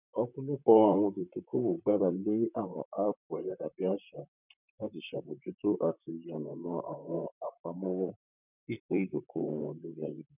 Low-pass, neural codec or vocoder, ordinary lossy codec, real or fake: 3.6 kHz; vocoder, 44.1 kHz, 128 mel bands, Pupu-Vocoder; none; fake